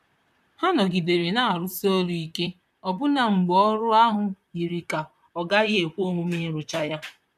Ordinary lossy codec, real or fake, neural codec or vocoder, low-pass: none; fake; vocoder, 44.1 kHz, 128 mel bands, Pupu-Vocoder; 14.4 kHz